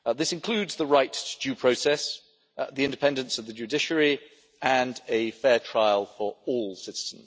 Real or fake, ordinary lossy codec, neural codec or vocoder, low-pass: real; none; none; none